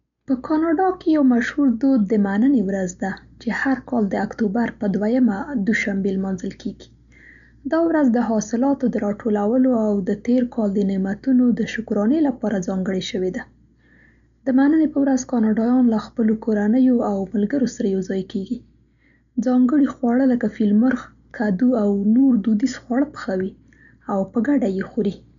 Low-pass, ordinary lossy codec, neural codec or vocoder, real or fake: 7.2 kHz; none; none; real